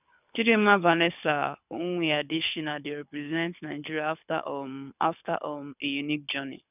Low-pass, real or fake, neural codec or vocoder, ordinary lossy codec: 3.6 kHz; fake; codec, 24 kHz, 6 kbps, HILCodec; none